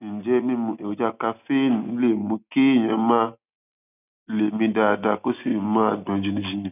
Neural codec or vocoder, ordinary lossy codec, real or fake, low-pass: none; none; real; 3.6 kHz